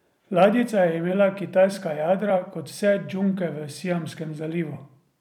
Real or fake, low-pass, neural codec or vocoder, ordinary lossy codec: fake; 19.8 kHz; vocoder, 44.1 kHz, 128 mel bands every 256 samples, BigVGAN v2; none